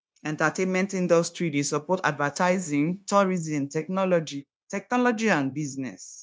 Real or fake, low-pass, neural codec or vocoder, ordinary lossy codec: fake; none; codec, 16 kHz, 0.9 kbps, LongCat-Audio-Codec; none